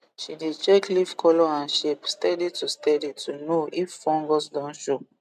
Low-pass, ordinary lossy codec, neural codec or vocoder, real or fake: 14.4 kHz; MP3, 96 kbps; none; real